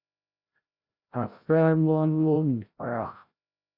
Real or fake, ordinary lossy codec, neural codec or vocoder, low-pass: fake; Opus, 64 kbps; codec, 16 kHz, 0.5 kbps, FreqCodec, larger model; 5.4 kHz